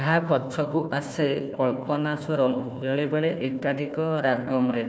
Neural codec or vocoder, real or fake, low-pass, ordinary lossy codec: codec, 16 kHz, 1 kbps, FunCodec, trained on Chinese and English, 50 frames a second; fake; none; none